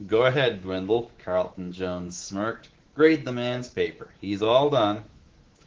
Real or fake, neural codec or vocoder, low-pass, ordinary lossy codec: fake; codec, 24 kHz, 3.1 kbps, DualCodec; 7.2 kHz; Opus, 16 kbps